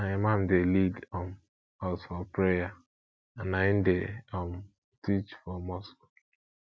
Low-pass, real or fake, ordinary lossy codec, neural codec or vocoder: none; real; none; none